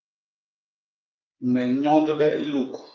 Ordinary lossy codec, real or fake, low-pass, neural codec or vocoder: Opus, 24 kbps; fake; 7.2 kHz; codec, 16 kHz, 4 kbps, FreqCodec, smaller model